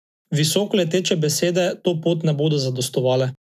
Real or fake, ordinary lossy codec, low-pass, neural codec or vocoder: real; none; 14.4 kHz; none